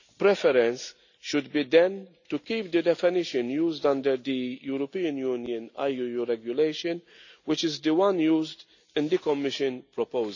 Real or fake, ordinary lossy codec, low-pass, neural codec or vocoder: real; none; 7.2 kHz; none